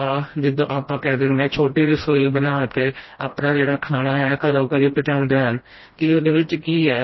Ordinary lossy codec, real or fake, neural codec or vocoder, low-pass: MP3, 24 kbps; fake; codec, 16 kHz, 1 kbps, FreqCodec, smaller model; 7.2 kHz